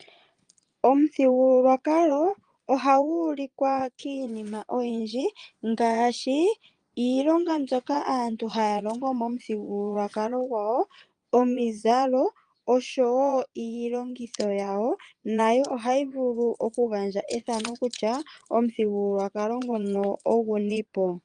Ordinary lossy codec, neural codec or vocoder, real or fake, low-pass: Opus, 32 kbps; vocoder, 22.05 kHz, 80 mel bands, Vocos; fake; 9.9 kHz